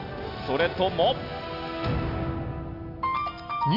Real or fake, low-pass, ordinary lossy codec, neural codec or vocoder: real; 5.4 kHz; Opus, 64 kbps; none